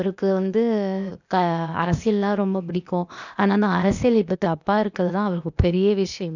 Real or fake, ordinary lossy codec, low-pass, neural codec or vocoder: fake; none; 7.2 kHz; codec, 16 kHz, 0.8 kbps, ZipCodec